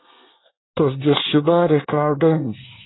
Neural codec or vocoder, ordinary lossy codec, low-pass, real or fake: codec, 24 kHz, 1 kbps, SNAC; AAC, 16 kbps; 7.2 kHz; fake